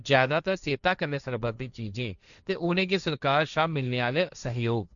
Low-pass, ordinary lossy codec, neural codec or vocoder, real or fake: 7.2 kHz; none; codec, 16 kHz, 1.1 kbps, Voila-Tokenizer; fake